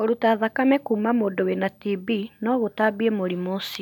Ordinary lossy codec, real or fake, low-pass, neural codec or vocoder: none; real; 19.8 kHz; none